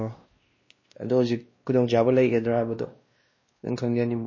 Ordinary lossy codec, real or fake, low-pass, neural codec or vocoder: MP3, 32 kbps; fake; 7.2 kHz; codec, 16 kHz, 1 kbps, X-Codec, WavLM features, trained on Multilingual LibriSpeech